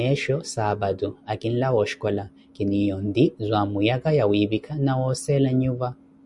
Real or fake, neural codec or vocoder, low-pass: real; none; 10.8 kHz